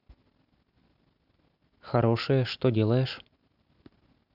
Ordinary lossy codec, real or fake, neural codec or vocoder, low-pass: none; real; none; 5.4 kHz